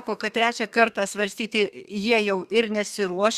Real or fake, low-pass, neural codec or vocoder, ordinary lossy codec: fake; 14.4 kHz; codec, 32 kHz, 1.9 kbps, SNAC; Opus, 64 kbps